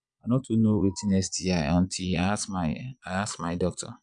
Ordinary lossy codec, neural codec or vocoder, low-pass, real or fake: none; vocoder, 22.05 kHz, 80 mel bands, Vocos; 9.9 kHz; fake